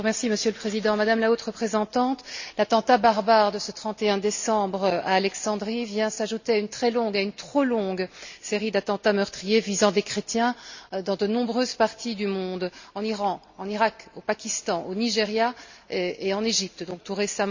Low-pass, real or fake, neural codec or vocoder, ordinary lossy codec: 7.2 kHz; real; none; Opus, 64 kbps